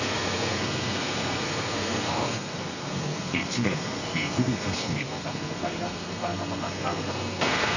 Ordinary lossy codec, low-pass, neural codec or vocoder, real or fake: none; 7.2 kHz; codec, 32 kHz, 1.9 kbps, SNAC; fake